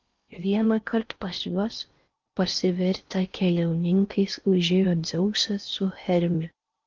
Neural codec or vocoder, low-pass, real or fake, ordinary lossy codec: codec, 16 kHz in and 24 kHz out, 0.6 kbps, FocalCodec, streaming, 4096 codes; 7.2 kHz; fake; Opus, 24 kbps